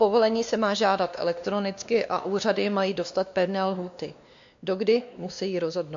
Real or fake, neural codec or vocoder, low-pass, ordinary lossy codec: fake; codec, 16 kHz, 2 kbps, X-Codec, WavLM features, trained on Multilingual LibriSpeech; 7.2 kHz; AAC, 64 kbps